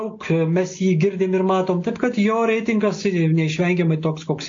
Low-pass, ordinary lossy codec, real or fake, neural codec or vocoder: 7.2 kHz; AAC, 48 kbps; real; none